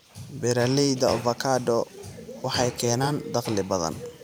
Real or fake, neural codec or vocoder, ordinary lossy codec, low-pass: fake; vocoder, 44.1 kHz, 128 mel bands every 512 samples, BigVGAN v2; none; none